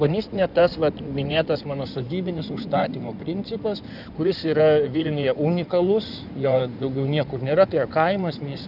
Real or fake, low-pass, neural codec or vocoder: fake; 5.4 kHz; codec, 16 kHz in and 24 kHz out, 2.2 kbps, FireRedTTS-2 codec